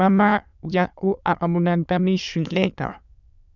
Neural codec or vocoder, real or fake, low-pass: autoencoder, 22.05 kHz, a latent of 192 numbers a frame, VITS, trained on many speakers; fake; 7.2 kHz